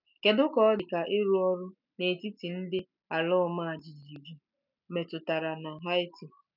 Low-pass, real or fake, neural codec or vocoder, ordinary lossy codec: 5.4 kHz; real; none; none